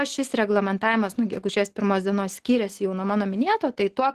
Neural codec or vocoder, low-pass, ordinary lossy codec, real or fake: none; 14.4 kHz; Opus, 16 kbps; real